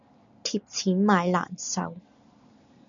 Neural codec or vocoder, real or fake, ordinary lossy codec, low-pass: none; real; MP3, 96 kbps; 7.2 kHz